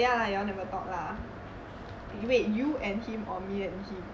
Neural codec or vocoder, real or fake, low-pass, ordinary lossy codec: none; real; none; none